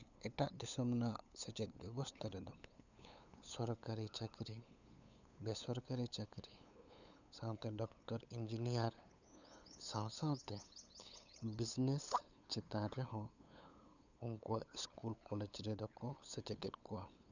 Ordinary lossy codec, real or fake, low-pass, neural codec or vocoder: none; fake; 7.2 kHz; codec, 16 kHz, 8 kbps, FunCodec, trained on LibriTTS, 25 frames a second